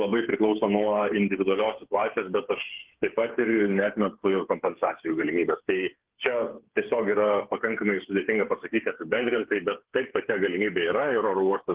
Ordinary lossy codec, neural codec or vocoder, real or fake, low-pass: Opus, 16 kbps; codec, 16 kHz, 8 kbps, FreqCodec, smaller model; fake; 3.6 kHz